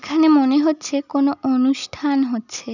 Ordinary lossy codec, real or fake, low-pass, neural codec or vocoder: none; real; 7.2 kHz; none